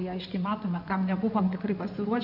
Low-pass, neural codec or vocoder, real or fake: 5.4 kHz; codec, 16 kHz in and 24 kHz out, 2.2 kbps, FireRedTTS-2 codec; fake